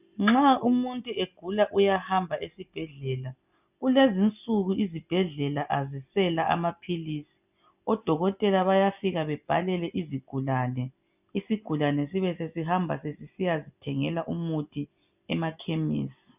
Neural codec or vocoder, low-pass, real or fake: none; 3.6 kHz; real